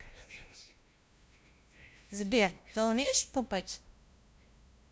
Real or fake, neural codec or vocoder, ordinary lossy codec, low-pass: fake; codec, 16 kHz, 0.5 kbps, FunCodec, trained on LibriTTS, 25 frames a second; none; none